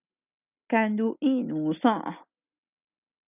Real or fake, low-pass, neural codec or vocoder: real; 3.6 kHz; none